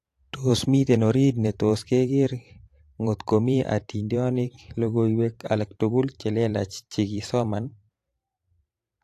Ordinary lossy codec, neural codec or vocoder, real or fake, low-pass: AAC, 64 kbps; vocoder, 44.1 kHz, 128 mel bands every 512 samples, BigVGAN v2; fake; 14.4 kHz